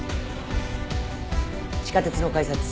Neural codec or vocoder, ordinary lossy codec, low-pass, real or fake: none; none; none; real